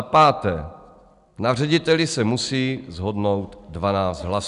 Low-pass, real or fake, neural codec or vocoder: 10.8 kHz; real; none